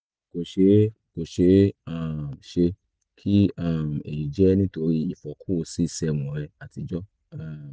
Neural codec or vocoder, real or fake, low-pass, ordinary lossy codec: none; real; none; none